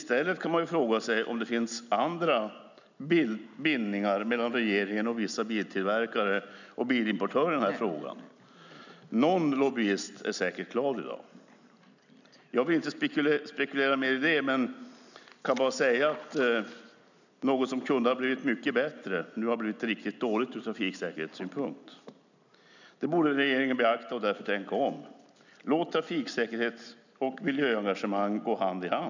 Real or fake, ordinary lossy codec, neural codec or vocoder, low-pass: real; none; none; 7.2 kHz